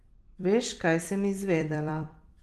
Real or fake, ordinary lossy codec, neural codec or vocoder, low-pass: fake; Opus, 24 kbps; vocoder, 44.1 kHz, 128 mel bands every 256 samples, BigVGAN v2; 14.4 kHz